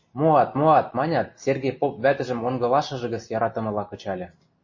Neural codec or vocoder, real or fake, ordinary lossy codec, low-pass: none; real; MP3, 32 kbps; 7.2 kHz